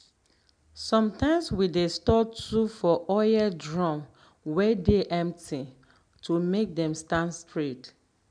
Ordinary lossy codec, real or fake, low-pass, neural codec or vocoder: none; real; 9.9 kHz; none